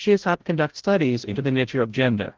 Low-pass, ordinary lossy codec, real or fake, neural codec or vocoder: 7.2 kHz; Opus, 16 kbps; fake; codec, 16 kHz, 0.5 kbps, FreqCodec, larger model